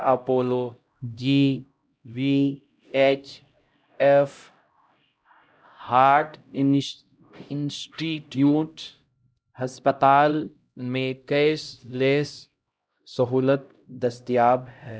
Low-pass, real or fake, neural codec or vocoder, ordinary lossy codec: none; fake; codec, 16 kHz, 0.5 kbps, X-Codec, HuBERT features, trained on LibriSpeech; none